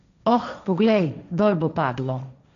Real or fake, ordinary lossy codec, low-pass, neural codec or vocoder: fake; none; 7.2 kHz; codec, 16 kHz, 1.1 kbps, Voila-Tokenizer